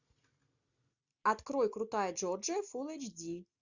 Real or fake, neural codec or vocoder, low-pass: real; none; 7.2 kHz